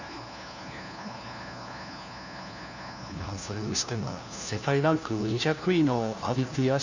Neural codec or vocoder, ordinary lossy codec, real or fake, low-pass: codec, 16 kHz, 1 kbps, FunCodec, trained on LibriTTS, 50 frames a second; none; fake; 7.2 kHz